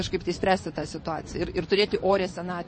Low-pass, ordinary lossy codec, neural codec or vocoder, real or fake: 9.9 kHz; MP3, 32 kbps; vocoder, 24 kHz, 100 mel bands, Vocos; fake